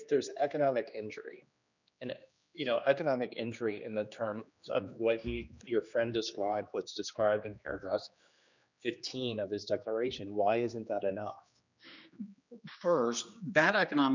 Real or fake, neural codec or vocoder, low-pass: fake; codec, 16 kHz, 2 kbps, X-Codec, HuBERT features, trained on general audio; 7.2 kHz